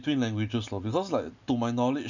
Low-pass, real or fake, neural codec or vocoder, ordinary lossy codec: 7.2 kHz; real; none; none